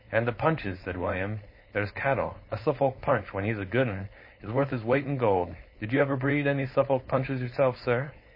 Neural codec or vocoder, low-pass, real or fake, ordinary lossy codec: codec, 16 kHz, 4.8 kbps, FACodec; 5.4 kHz; fake; MP3, 24 kbps